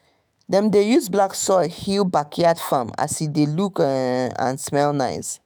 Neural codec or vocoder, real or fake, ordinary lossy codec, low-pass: autoencoder, 48 kHz, 128 numbers a frame, DAC-VAE, trained on Japanese speech; fake; none; none